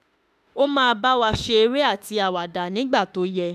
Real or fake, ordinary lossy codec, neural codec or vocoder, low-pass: fake; none; autoencoder, 48 kHz, 32 numbers a frame, DAC-VAE, trained on Japanese speech; 14.4 kHz